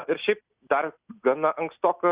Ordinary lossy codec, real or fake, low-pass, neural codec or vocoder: Opus, 64 kbps; real; 3.6 kHz; none